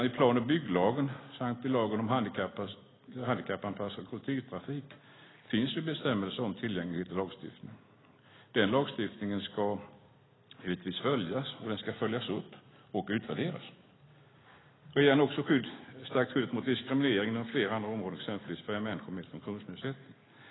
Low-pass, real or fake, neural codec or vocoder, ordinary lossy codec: 7.2 kHz; real; none; AAC, 16 kbps